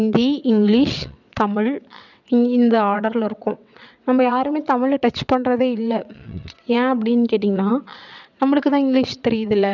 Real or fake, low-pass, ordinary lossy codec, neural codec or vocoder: fake; 7.2 kHz; none; vocoder, 22.05 kHz, 80 mel bands, WaveNeXt